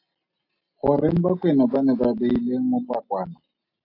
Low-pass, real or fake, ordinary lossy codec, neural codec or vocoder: 5.4 kHz; real; AAC, 32 kbps; none